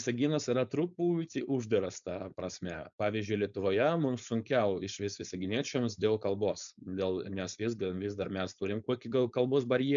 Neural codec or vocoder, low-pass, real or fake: codec, 16 kHz, 4.8 kbps, FACodec; 7.2 kHz; fake